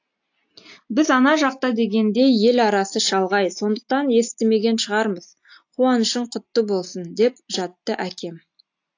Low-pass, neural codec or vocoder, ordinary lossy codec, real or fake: 7.2 kHz; none; AAC, 48 kbps; real